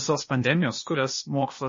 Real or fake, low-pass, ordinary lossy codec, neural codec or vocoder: fake; 7.2 kHz; MP3, 32 kbps; codec, 16 kHz, 0.8 kbps, ZipCodec